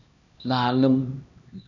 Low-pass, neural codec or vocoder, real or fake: 7.2 kHz; codec, 16 kHz, 1 kbps, X-Codec, HuBERT features, trained on LibriSpeech; fake